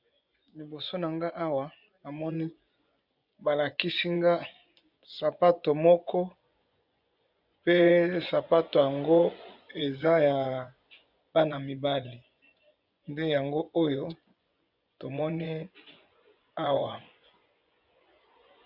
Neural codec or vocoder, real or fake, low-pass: vocoder, 24 kHz, 100 mel bands, Vocos; fake; 5.4 kHz